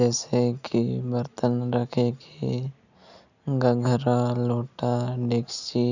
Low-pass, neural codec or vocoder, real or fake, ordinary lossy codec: 7.2 kHz; none; real; none